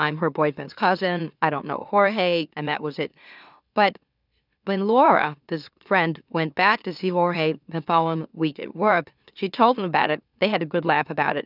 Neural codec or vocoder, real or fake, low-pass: autoencoder, 44.1 kHz, a latent of 192 numbers a frame, MeloTTS; fake; 5.4 kHz